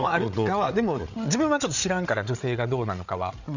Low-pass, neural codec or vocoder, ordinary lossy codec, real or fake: 7.2 kHz; codec, 16 kHz, 8 kbps, FreqCodec, larger model; none; fake